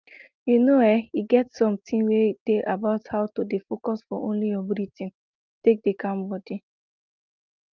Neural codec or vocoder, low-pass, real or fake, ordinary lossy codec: none; 7.2 kHz; real; Opus, 32 kbps